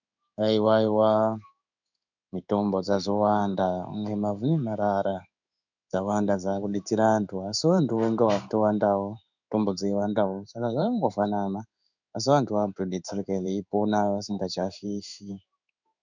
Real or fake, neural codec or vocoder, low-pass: fake; codec, 16 kHz in and 24 kHz out, 1 kbps, XY-Tokenizer; 7.2 kHz